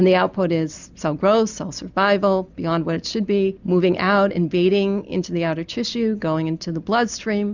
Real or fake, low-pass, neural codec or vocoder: real; 7.2 kHz; none